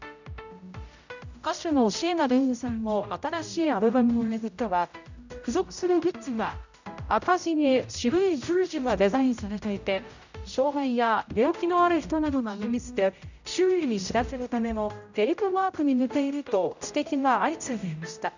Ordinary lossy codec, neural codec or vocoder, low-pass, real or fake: none; codec, 16 kHz, 0.5 kbps, X-Codec, HuBERT features, trained on general audio; 7.2 kHz; fake